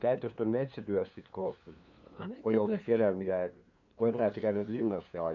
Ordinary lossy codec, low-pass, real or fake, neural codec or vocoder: none; 7.2 kHz; fake; codec, 16 kHz, 2 kbps, FunCodec, trained on LibriTTS, 25 frames a second